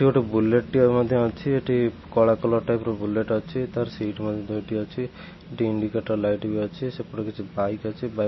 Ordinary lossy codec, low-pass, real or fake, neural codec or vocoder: MP3, 24 kbps; 7.2 kHz; real; none